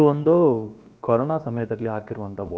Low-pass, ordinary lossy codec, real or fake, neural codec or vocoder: none; none; fake; codec, 16 kHz, about 1 kbps, DyCAST, with the encoder's durations